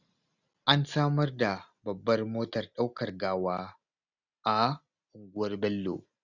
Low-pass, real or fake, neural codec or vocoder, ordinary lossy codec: 7.2 kHz; real; none; none